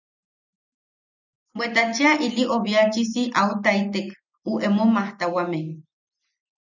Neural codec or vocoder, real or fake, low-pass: none; real; 7.2 kHz